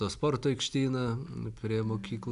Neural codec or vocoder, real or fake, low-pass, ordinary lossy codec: none; real; 10.8 kHz; MP3, 96 kbps